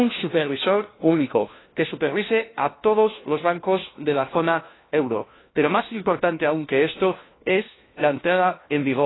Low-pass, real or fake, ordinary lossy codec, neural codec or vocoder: 7.2 kHz; fake; AAC, 16 kbps; codec, 16 kHz, 1 kbps, FunCodec, trained on LibriTTS, 50 frames a second